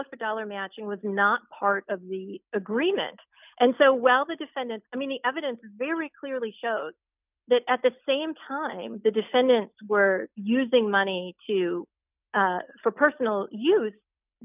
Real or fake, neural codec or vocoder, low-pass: real; none; 3.6 kHz